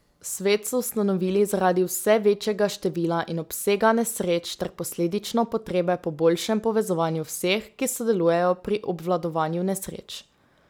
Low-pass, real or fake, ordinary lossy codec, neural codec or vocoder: none; real; none; none